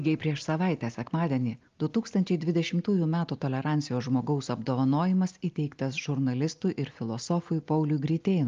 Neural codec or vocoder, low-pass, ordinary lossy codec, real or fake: none; 7.2 kHz; Opus, 32 kbps; real